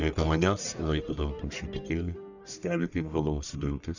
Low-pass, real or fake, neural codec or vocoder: 7.2 kHz; fake; codec, 44.1 kHz, 1.7 kbps, Pupu-Codec